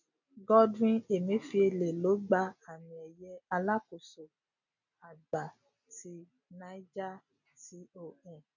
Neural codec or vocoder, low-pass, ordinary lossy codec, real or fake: none; 7.2 kHz; none; real